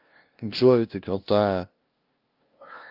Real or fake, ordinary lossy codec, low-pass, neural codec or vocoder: fake; Opus, 24 kbps; 5.4 kHz; codec, 16 kHz, 0.5 kbps, FunCodec, trained on LibriTTS, 25 frames a second